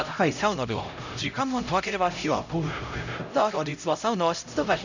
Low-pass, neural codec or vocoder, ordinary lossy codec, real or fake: 7.2 kHz; codec, 16 kHz, 0.5 kbps, X-Codec, HuBERT features, trained on LibriSpeech; none; fake